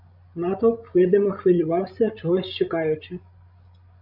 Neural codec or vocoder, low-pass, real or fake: codec, 16 kHz, 16 kbps, FreqCodec, larger model; 5.4 kHz; fake